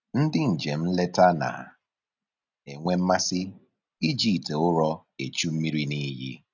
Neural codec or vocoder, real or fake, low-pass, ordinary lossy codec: none; real; 7.2 kHz; none